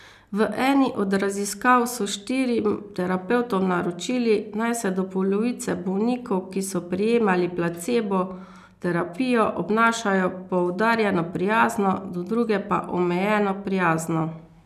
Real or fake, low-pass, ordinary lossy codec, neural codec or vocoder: real; 14.4 kHz; none; none